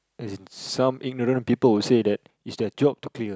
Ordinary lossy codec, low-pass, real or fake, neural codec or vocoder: none; none; real; none